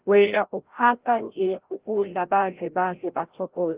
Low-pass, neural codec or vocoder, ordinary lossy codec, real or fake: 3.6 kHz; codec, 16 kHz, 0.5 kbps, FreqCodec, larger model; Opus, 16 kbps; fake